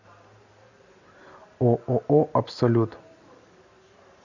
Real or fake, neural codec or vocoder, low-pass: real; none; 7.2 kHz